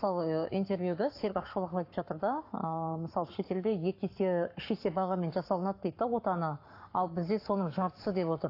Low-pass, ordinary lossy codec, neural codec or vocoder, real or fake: 5.4 kHz; AAC, 32 kbps; codec, 44.1 kHz, 7.8 kbps, Pupu-Codec; fake